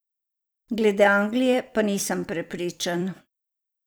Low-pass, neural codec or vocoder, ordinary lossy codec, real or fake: none; vocoder, 44.1 kHz, 128 mel bands every 256 samples, BigVGAN v2; none; fake